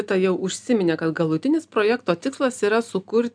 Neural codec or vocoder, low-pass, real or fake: none; 9.9 kHz; real